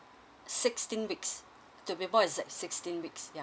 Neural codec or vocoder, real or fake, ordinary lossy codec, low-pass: none; real; none; none